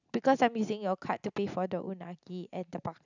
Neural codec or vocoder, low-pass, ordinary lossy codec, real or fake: none; 7.2 kHz; none; real